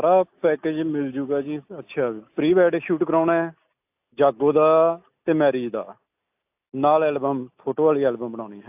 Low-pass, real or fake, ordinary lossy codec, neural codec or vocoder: 3.6 kHz; real; AAC, 32 kbps; none